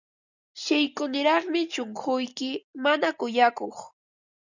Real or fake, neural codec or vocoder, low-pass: real; none; 7.2 kHz